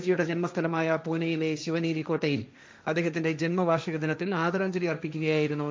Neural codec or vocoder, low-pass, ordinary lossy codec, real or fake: codec, 16 kHz, 1.1 kbps, Voila-Tokenizer; none; none; fake